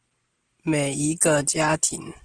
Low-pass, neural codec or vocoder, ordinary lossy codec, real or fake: 9.9 kHz; none; Opus, 16 kbps; real